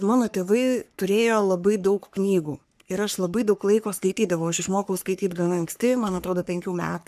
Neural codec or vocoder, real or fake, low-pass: codec, 44.1 kHz, 3.4 kbps, Pupu-Codec; fake; 14.4 kHz